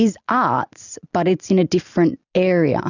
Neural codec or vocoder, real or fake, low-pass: none; real; 7.2 kHz